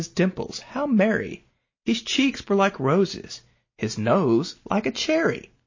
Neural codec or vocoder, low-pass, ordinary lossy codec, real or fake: none; 7.2 kHz; MP3, 32 kbps; real